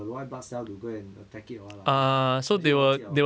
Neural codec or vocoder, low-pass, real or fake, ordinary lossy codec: none; none; real; none